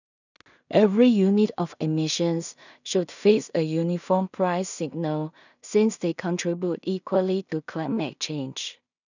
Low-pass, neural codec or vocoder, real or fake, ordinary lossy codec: 7.2 kHz; codec, 16 kHz in and 24 kHz out, 0.4 kbps, LongCat-Audio-Codec, two codebook decoder; fake; none